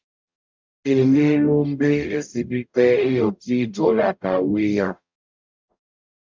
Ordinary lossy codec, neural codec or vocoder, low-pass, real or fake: MP3, 64 kbps; codec, 44.1 kHz, 0.9 kbps, DAC; 7.2 kHz; fake